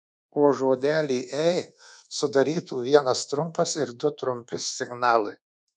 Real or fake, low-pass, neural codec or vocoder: fake; 10.8 kHz; codec, 24 kHz, 1.2 kbps, DualCodec